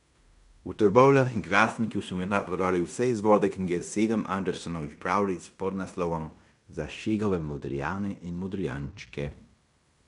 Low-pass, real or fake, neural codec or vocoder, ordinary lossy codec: 10.8 kHz; fake; codec, 16 kHz in and 24 kHz out, 0.9 kbps, LongCat-Audio-Codec, fine tuned four codebook decoder; none